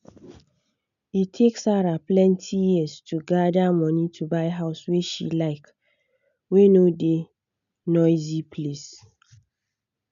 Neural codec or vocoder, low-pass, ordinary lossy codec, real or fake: none; 7.2 kHz; none; real